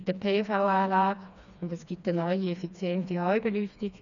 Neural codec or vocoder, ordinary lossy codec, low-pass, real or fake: codec, 16 kHz, 2 kbps, FreqCodec, smaller model; MP3, 96 kbps; 7.2 kHz; fake